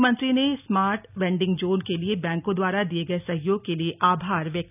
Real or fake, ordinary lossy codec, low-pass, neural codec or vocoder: real; none; 3.6 kHz; none